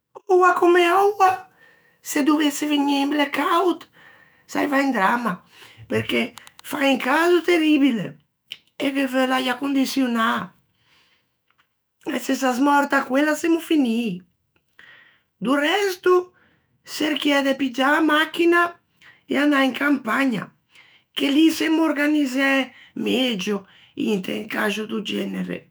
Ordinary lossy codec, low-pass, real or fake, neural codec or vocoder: none; none; fake; autoencoder, 48 kHz, 128 numbers a frame, DAC-VAE, trained on Japanese speech